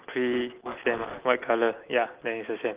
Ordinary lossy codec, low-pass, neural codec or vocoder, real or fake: Opus, 32 kbps; 3.6 kHz; none; real